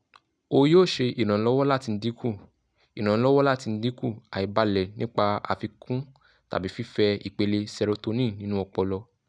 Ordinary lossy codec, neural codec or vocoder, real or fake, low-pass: none; none; real; 9.9 kHz